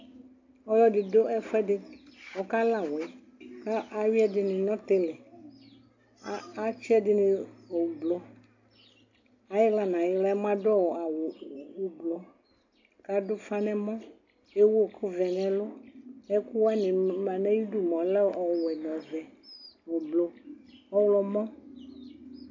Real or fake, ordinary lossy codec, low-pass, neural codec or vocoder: real; AAC, 48 kbps; 7.2 kHz; none